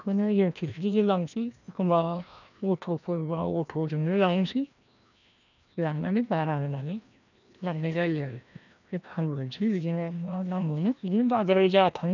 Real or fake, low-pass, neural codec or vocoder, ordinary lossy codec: fake; 7.2 kHz; codec, 16 kHz, 1 kbps, FreqCodec, larger model; none